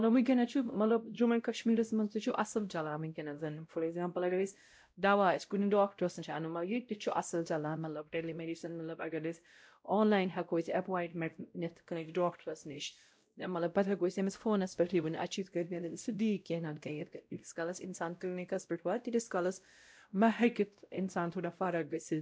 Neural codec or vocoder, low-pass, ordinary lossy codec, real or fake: codec, 16 kHz, 0.5 kbps, X-Codec, WavLM features, trained on Multilingual LibriSpeech; none; none; fake